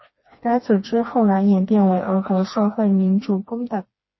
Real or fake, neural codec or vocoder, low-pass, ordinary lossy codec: fake; codec, 16 kHz, 2 kbps, FreqCodec, smaller model; 7.2 kHz; MP3, 24 kbps